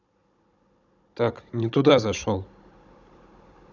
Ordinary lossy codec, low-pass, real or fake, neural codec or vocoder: none; 7.2 kHz; fake; codec, 16 kHz, 16 kbps, FunCodec, trained on Chinese and English, 50 frames a second